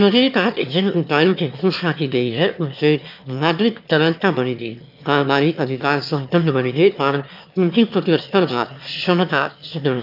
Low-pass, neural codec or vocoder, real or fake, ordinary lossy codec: 5.4 kHz; autoencoder, 22.05 kHz, a latent of 192 numbers a frame, VITS, trained on one speaker; fake; AAC, 48 kbps